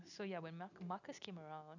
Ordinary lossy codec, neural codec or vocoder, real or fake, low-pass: none; none; real; 7.2 kHz